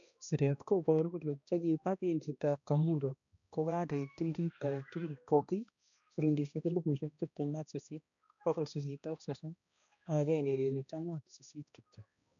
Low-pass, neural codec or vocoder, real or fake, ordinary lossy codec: 7.2 kHz; codec, 16 kHz, 1 kbps, X-Codec, HuBERT features, trained on balanced general audio; fake; none